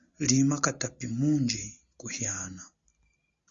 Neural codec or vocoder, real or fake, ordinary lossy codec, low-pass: none; real; Opus, 64 kbps; 7.2 kHz